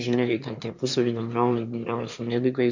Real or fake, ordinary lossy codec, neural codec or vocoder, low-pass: fake; MP3, 48 kbps; autoencoder, 22.05 kHz, a latent of 192 numbers a frame, VITS, trained on one speaker; 7.2 kHz